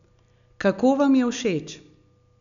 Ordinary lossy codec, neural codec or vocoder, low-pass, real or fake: none; none; 7.2 kHz; real